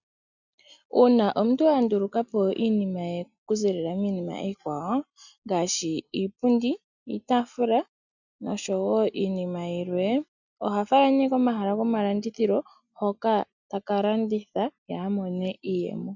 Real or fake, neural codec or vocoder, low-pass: real; none; 7.2 kHz